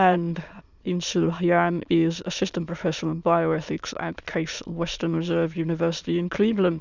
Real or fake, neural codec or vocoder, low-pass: fake; autoencoder, 22.05 kHz, a latent of 192 numbers a frame, VITS, trained on many speakers; 7.2 kHz